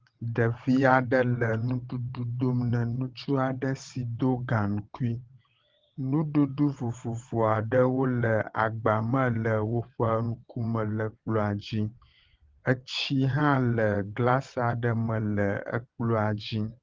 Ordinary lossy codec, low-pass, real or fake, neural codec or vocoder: Opus, 16 kbps; 7.2 kHz; fake; vocoder, 22.05 kHz, 80 mel bands, WaveNeXt